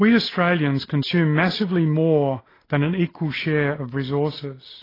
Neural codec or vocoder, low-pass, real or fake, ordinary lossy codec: none; 5.4 kHz; real; AAC, 24 kbps